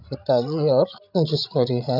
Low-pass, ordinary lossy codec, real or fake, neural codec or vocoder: 5.4 kHz; none; fake; codec, 16 kHz, 16 kbps, FreqCodec, larger model